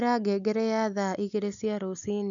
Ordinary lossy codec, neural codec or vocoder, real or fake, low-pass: none; none; real; 7.2 kHz